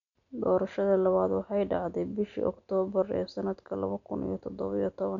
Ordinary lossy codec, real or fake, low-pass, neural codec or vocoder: none; real; 7.2 kHz; none